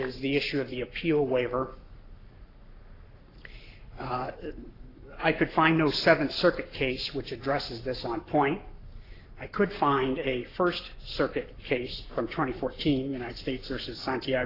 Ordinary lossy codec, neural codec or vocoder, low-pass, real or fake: AAC, 24 kbps; codec, 44.1 kHz, 7.8 kbps, Pupu-Codec; 5.4 kHz; fake